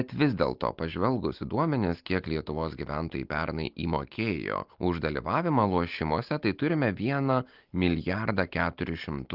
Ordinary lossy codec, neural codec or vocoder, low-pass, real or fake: Opus, 32 kbps; none; 5.4 kHz; real